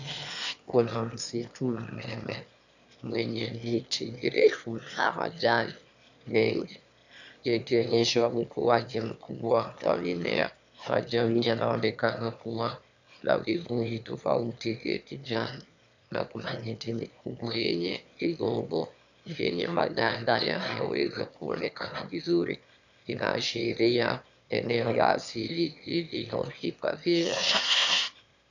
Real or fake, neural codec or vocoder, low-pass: fake; autoencoder, 22.05 kHz, a latent of 192 numbers a frame, VITS, trained on one speaker; 7.2 kHz